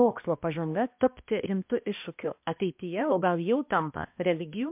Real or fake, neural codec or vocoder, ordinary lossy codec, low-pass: fake; codec, 16 kHz, 1 kbps, X-Codec, HuBERT features, trained on balanced general audio; MP3, 32 kbps; 3.6 kHz